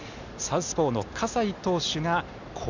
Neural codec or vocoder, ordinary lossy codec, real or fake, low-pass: none; none; real; 7.2 kHz